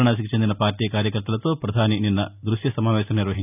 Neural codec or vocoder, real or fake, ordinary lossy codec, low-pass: none; real; none; 3.6 kHz